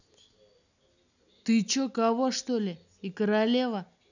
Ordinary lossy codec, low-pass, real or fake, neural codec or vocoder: none; 7.2 kHz; real; none